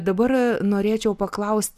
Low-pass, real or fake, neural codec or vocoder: 14.4 kHz; real; none